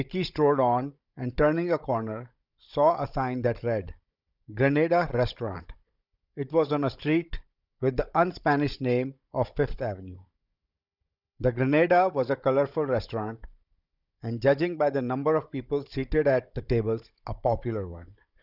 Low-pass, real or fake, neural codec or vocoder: 5.4 kHz; fake; codec, 16 kHz, 16 kbps, FunCodec, trained on Chinese and English, 50 frames a second